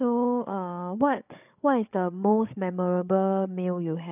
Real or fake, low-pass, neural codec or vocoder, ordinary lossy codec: fake; 3.6 kHz; codec, 16 kHz, 8 kbps, FreqCodec, larger model; none